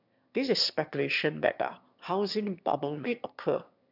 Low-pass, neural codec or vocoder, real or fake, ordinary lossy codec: 5.4 kHz; autoencoder, 22.05 kHz, a latent of 192 numbers a frame, VITS, trained on one speaker; fake; none